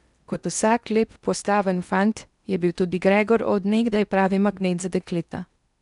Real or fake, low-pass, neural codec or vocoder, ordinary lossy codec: fake; 10.8 kHz; codec, 16 kHz in and 24 kHz out, 0.8 kbps, FocalCodec, streaming, 65536 codes; none